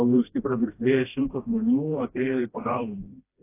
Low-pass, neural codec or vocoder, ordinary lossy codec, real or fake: 3.6 kHz; codec, 16 kHz, 1 kbps, FreqCodec, smaller model; AAC, 24 kbps; fake